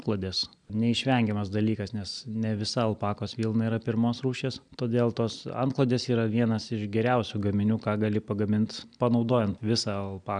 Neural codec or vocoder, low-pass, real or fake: none; 9.9 kHz; real